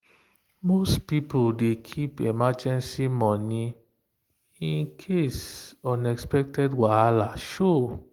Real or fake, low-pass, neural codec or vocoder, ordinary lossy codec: real; 19.8 kHz; none; Opus, 24 kbps